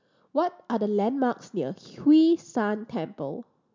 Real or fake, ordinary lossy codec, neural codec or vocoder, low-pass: real; MP3, 64 kbps; none; 7.2 kHz